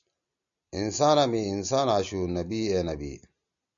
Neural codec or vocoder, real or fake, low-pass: none; real; 7.2 kHz